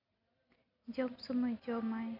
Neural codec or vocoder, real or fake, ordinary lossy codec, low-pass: none; real; AAC, 32 kbps; 5.4 kHz